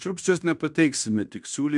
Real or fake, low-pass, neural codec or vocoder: fake; 10.8 kHz; codec, 16 kHz in and 24 kHz out, 0.9 kbps, LongCat-Audio-Codec, fine tuned four codebook decoder